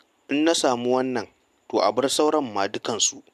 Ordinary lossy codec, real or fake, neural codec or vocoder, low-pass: MP3, 96 kbps; real; none; 14.4 kHz